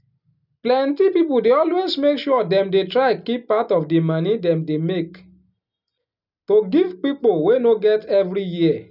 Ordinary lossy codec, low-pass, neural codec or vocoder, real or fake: none; 5.4 kHz; none; real